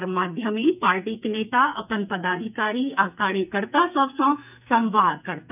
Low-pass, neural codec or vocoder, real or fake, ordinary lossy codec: 3.6 kHz; codec, 44.1 kHz, 2.6 kbps, SNAC; fake; none